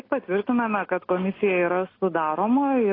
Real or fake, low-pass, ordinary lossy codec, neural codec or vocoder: real; 5.4 kHz; AAC, 24 kbps; none